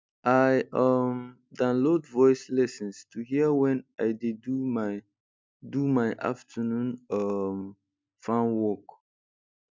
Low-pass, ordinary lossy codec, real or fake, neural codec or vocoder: none; none; real; none